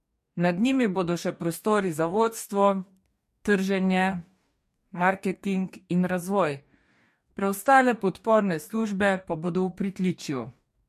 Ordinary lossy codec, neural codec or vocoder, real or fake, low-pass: MP3, 64 kbps; codec, 44.1 kHz, 2.6 kbps, DAC; fake; 14.4 kHz